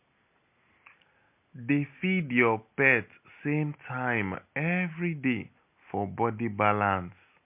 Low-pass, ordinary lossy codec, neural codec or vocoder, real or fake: 3.6 kHz; MP3, 32 kbps; none; real